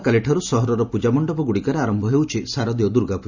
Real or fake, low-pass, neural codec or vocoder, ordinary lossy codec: real; 7.2 kHz; none; none